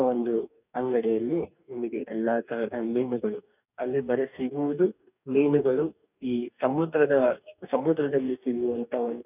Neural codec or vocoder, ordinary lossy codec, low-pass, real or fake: codec, 44.1 kHz, 2.6 kbps, DAC; none; 3.6 kHz; fake